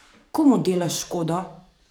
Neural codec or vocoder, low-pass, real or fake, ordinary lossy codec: codec, 44.1 kHz, 7.8 kbps, DAC; none; fake; none